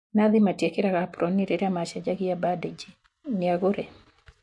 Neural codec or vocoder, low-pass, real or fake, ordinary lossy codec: none; 10.8 kHz; real; MP3, 64 kbps